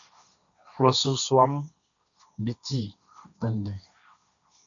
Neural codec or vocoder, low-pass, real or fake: codec, 16 kHz, 1.1 kbps, Voila-Tokenizer; 7.2 kHz; fake